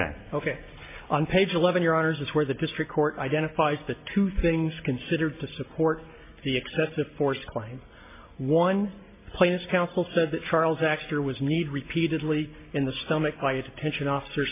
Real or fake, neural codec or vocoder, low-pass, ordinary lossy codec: real; none; 3.6 kHz; MP3, 24 kbps